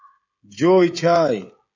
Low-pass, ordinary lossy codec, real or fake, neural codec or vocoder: 7.2 kHz; AAC, 48 kbps; fake; codec, 16 kHz, 16 kbps, FreqCodec, smaller model